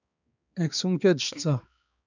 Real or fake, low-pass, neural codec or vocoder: fake; 7.2 kHz; codec, 16 kHz, 2 kbps, X-Codec, HuBERT features, trained on balanced general audio